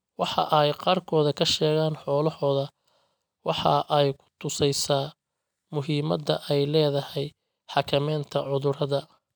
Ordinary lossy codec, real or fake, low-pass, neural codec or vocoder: none; real; none; none